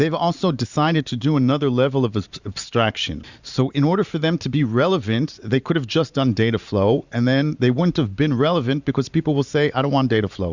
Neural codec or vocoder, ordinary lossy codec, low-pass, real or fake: vocoder, 44.1 kHz, 128 mel bands every 512 samples, BigVGAN v2; Opus, 64 kbps; 7.2 kHz; fake